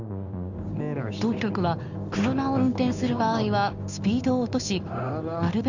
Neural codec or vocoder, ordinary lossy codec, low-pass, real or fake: codec, 16 kHz in and 24 kHz out, 1 kbps, XY-Tokenizer; none; 7.2 kHz; fake